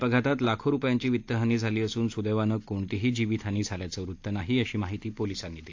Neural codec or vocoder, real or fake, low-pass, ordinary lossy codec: none; real; 7.2 kHz; AAC, 48 kbps